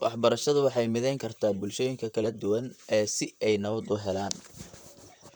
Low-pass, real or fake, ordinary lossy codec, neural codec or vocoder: none; fake; none; vocoder, 44.1 kHz, 128 mel bands, Pupu-Vocoder